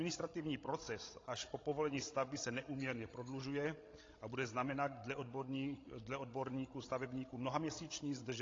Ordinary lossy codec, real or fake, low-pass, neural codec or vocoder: AAC, 32 kbps; fake; 7.2 kHz; codec, 16 kHz, 16 kbps, FreqCodec, larger model